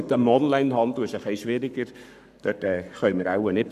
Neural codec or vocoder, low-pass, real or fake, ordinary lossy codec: codec, 44.1 kHz, 7.8 kbps, Pupu-Codec; 14.4 kHz; fake; none